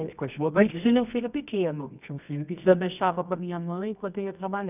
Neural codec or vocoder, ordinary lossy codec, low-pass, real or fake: codec, 24 kHz, 0.9 kbps, WavTokenizer, medium music audio release; none; 3.6 kHz; fake